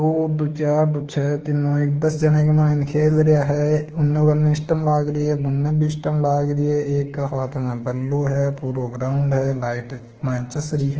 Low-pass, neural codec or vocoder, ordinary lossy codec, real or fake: 7.2 kHz; autoencoder, 48 kHz, 32 numbers a frame, DAC-VAE, trained on Japanese speech; Opus, 16 kbps; fake